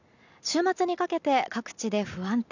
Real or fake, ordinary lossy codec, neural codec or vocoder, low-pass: real; none; none; 7.2 kHz